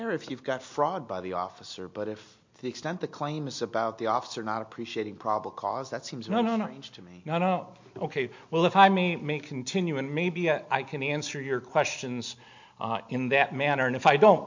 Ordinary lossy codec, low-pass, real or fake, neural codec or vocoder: MP3, 48 kbps; 7.2 kHz; real; none